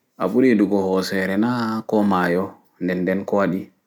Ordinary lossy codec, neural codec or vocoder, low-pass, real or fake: none; none; 19.8 kHz; real